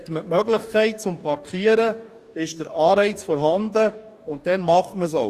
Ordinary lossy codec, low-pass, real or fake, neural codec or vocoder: Opus, 64 kbps; 14.4 kHz; fake; codec, 44.1 kHz, 2.6 kbps, DAC